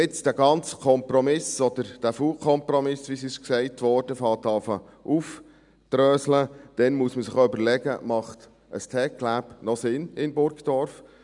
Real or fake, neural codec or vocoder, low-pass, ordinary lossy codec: real; none; 10.8 kHz; none